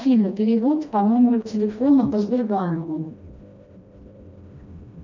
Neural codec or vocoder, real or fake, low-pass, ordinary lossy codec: codec, 16 kHz, 1 kbps, FreqCodec, smaller model; fake; 7.2 kHz; MP3, 64 kbps